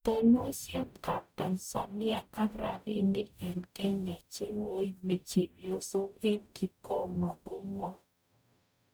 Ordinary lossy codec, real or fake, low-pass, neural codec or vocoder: none; fake; none; codec, 44.1 kHz, 0.9 kbps, DAC